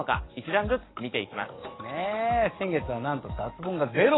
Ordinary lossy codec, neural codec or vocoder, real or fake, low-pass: AAC, 16 kbps; codec, 44.1 kHz, 7.8 kbps, DAC; fake; 7.2 kHz